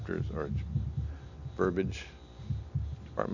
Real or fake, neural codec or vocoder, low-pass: real; none; 7.2 kHz